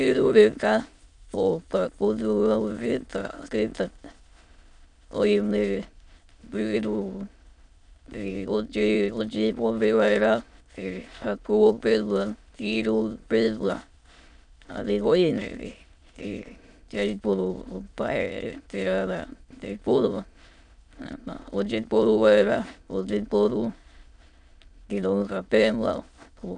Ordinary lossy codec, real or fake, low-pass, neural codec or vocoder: none; fake; 9.9 kHz; autoencoder, 22.05 kHz, a latent of 192 numbers a frame, VITS, trained on many speakers